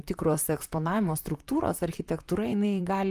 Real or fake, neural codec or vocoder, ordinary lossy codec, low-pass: fake; vocoder, 44.1 kHz, 128 mel bands, Pupu-Vocoder; Opus, 32 kbps; 14.4 kHz